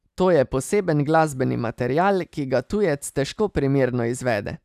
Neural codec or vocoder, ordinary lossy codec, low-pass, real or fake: none; none; 14.4 kHz; real